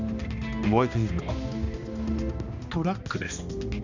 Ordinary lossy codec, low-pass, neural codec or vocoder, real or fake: none; 7.2 kHz; codec, 16 kHz, 1 kbps, X-Codec, HuBERT features, trained on balanced general audio; fake